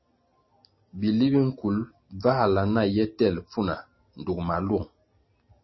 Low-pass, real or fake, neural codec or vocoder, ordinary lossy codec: 7.2 kHz; real; none; MP3, 24 kbps